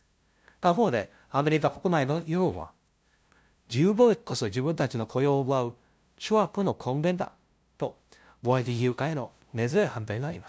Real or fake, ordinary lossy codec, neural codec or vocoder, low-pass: fake; none; codec, 16 kHz, 0.5 kbps, FunCodec, trained on LibriTTS, 25 frames a second; none